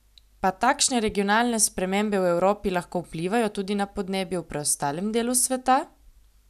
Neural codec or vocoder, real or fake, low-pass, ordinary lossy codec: none; real; 14.4 kHz; none